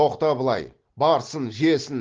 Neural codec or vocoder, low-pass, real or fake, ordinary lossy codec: none; 7.2 kHz; real; Opus, 16 kbps